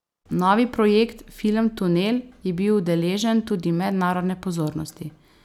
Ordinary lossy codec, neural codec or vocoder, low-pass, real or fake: none; none; 19.8 kHz; real